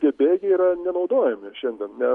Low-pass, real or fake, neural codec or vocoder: 10.8 kHz; real; none